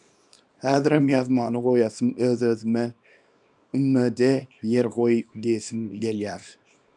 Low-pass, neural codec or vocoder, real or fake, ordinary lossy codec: 10.8 kHz; codec, 24 kHz, 0.9 kbps, WavTokenizer, small release; fake; MP3, 96 kbps